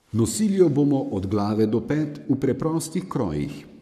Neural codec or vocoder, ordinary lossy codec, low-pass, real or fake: codec, 44.1 kHz, 7.8 kbps, Pupu-Codec; none; 14.4 kHz; fake